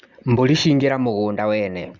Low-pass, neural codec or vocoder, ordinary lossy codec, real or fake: 7.2 kHz; none; Opus, 64 kbps; real